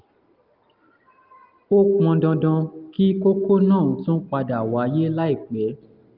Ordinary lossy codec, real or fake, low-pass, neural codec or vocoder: Opus, 32 kbps; real; 5.4 kHz; none